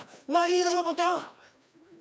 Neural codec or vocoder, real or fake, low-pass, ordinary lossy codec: codec, 16 kHz, 1 kbps, FreqCodec, larger model; fake; none; none